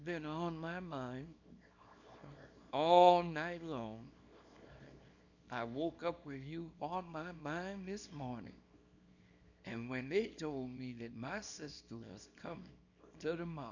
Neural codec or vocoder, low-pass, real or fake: codec, 24 kHz, 0.9 kbps, WavTokenizer, small release; 7.2 kHz; fake